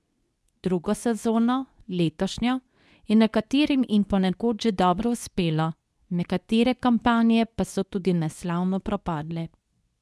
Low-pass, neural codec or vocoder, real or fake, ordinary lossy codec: none; codec, 24 kHz, 0.9 kbps, WavTokenizer, medium speech release version 2; fake; none